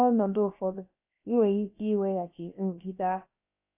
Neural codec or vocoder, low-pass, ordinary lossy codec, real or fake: codec, 16 kHz, about 1 kbps, DyCAST, with the encoder's durations; 3.6 kHz; AAC, 24 kbps; fake